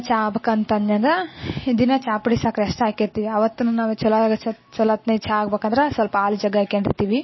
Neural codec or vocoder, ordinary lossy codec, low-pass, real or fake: none; MP3, 24 kbps; 7.2 kHz; real